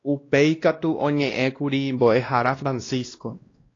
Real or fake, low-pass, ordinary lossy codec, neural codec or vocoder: fake; 7.2 kHz; AAC, 32 kbps; codec, 16 kHz, 1 kbps, X-Codec, WavLM features, trained on Multilingual LibriSpeech